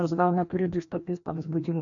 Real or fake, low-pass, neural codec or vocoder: fake; 7.2 kHz; codec, 16 kHz, 1 kbps, FreqCodec, larger model